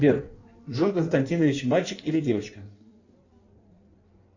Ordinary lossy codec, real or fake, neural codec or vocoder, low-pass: AAC, 48 kbps; fake; codec, 16 kHz in and 24 kHz out, 1.1 kbps, FireRedTTS-2 codec; 7.2 kHz